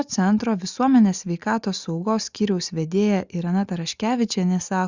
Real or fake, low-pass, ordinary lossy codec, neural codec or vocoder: real; 7.2 kHz; Opus, 64 kbps; none